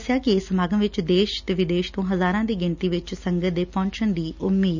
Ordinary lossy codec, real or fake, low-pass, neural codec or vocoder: none; real; 7.2 kHz; none